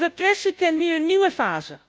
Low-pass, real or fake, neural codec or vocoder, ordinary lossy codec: none; fake; codec, 16 kHz, 0.5 kbps, FunCodec, trained on Chinese and English, 25 frames a second; none